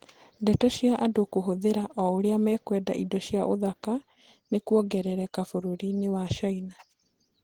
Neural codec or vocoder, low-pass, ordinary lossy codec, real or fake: none; 19.8 kHz; Opus, 16 kbps; real